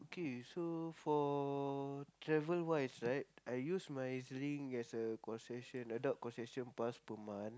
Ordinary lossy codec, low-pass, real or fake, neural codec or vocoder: none; none; real; none